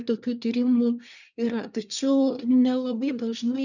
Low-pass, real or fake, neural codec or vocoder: 7.2 kHz; fake; codec, 24 kHz, 1 kbps, SNAC